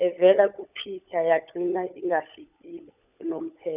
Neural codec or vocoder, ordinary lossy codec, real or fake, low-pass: codec, 16 kHz, 16 kbps, FunCodec, trained on LibriTTS, 50 frames a second; none; fake; 3.6 kHz